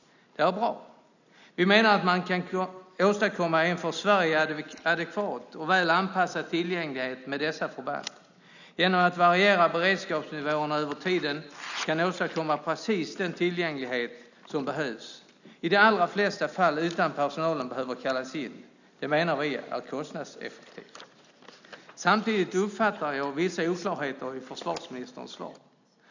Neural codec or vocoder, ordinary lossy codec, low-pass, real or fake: none; none; 7.2 kHz; real